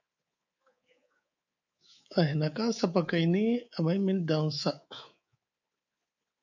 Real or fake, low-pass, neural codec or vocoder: fake; 7.2 kHz; codec, 24 kHz, 3.1 kbps, DualCodec